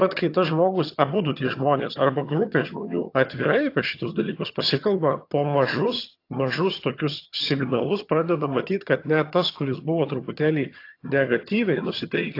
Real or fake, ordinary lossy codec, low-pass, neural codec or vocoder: fake; AAC, 32 kbps; 5.4 kHz; vocoder, 22.05 kHz, 80 mel bands, HiFi-GAN